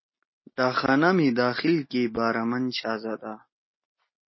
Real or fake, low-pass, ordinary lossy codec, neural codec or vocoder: real; 7.2 kHz; MP3, 24 kbps; none